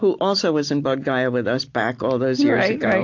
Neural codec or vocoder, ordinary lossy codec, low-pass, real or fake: none; AAC, 48 kbps; 7.2 kHz; real